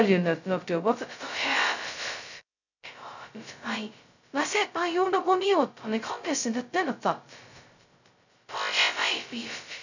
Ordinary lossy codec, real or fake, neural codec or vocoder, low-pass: none; fake; codec, 16 kHz, 0.2 kbps, FocalCodec; 7.2 kHz